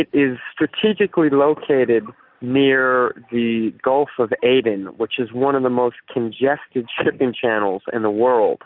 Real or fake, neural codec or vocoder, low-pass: real; none; 5.4 kHz